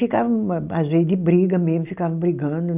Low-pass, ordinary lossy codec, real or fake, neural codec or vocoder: 3.6 kHz; none; real; none